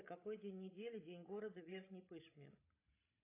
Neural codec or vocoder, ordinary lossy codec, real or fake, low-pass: codec, 16 kHz, 16 kbps, FreqCodec, larger model; AAC, 16 kbps; fake; 3.6 kHz